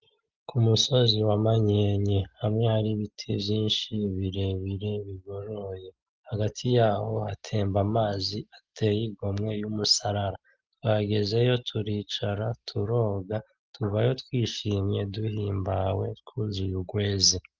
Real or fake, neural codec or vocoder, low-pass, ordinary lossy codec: real; none; 7.2 kHz; Opus, 32 kbps